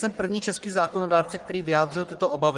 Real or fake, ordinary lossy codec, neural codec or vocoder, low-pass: fake; Opus, 24 kbps; codec, 44.1 kHz, 1.7 kbps, Pupu-Codec; 10.8 kHz